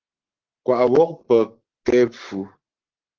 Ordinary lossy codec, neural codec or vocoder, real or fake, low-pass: Opus, 16 kbps; codec, 44.1 kHz, 7.8 kbps, Pupu-Codec; fake; 7.2 kHz